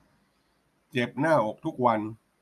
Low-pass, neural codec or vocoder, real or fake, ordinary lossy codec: 14.4 kHz; none; real; none